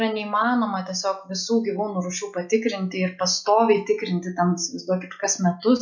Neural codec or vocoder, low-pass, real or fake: none; 7.2 kHz; real